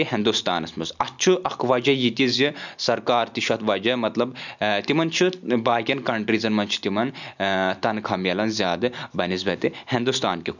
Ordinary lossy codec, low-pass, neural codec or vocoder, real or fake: none; 7.2 kHz; none; real